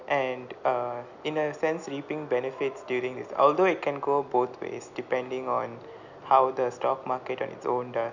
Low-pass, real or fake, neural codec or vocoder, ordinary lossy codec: 7.2 kHz; real; none; none